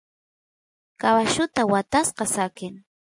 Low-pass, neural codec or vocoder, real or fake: 10.8 kHz; none; real